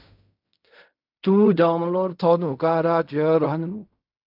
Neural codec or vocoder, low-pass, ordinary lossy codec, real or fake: codec, 16 kHz in and 24 kHz out, 0.4 kbps, LongCat-Audio-Codec, fine tuned four codebook decoder; 5.4 kHz; MP3, 48 kbps; fake